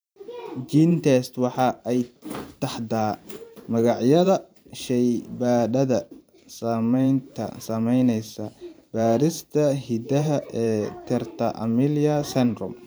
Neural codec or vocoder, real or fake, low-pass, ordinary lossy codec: vocoder, 44.1 kHz, 128 mel bands every 512 samples, BigVGAN v2; fake; none; none